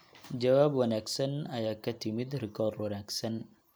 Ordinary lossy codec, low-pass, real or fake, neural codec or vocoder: none; none; real; none